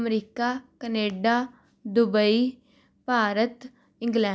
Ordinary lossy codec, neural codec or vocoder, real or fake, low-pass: none; none; real; none